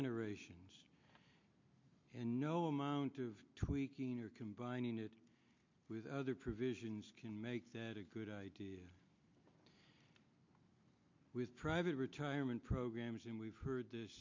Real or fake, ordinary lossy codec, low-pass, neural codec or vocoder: real; MP3, 48 kbps; 7.2 kHz; none